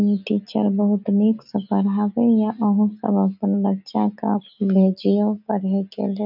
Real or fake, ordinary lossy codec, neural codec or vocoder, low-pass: real; none; none; 5.4 kHz